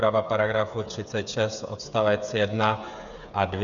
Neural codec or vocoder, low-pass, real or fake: codec, 16 kHz, 8 kbps, FreqCodec, smaller model; 7.2 kHz; fake